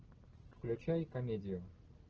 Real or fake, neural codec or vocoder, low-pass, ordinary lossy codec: real; none; 7.2 kHz; Opus, 16 kbps